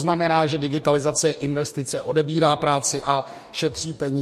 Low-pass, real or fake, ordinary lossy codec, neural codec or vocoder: 14.4 kHz; fake; MP3, 64 kbps; codec, 44.1 kHz, 2.6 kbps, DAC